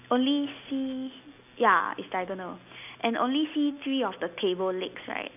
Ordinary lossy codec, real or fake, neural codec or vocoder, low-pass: none; real; none; 3.6 kHz